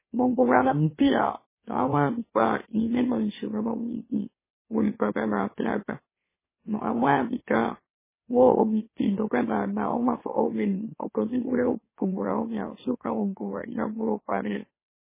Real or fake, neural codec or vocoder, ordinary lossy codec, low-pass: fake; autoencoder, 44.1 kHz, a latent of 192 numbers a frame, MeloTTS; MP3, 16 kbps; 3.6 kHz